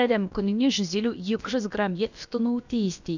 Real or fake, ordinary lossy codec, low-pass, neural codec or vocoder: fake; none; 7.2 kHz; codec, 16 kHz, about 1 kbps, DyCAST, with the encoder's durations